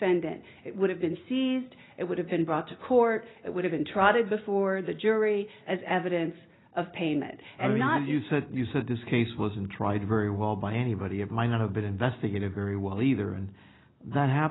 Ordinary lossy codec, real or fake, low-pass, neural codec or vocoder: AAC, 16 kbps; real; 7.2 kHz; none